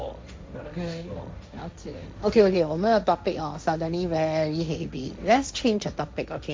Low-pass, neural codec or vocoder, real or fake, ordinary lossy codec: none; codec, 16 kHz, 1.1 kbps, Voila-Tokenizer; fake; none